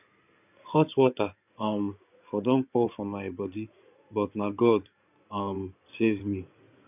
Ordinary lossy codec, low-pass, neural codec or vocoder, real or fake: none; 3.6 kHz; codec, 16 kHz in and 24 kHz out, 2.2 kbps, FireRedTTS-2 codec; fake